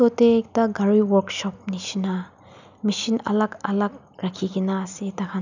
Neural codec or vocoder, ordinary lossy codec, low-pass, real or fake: none; none; 7.2 kHz; real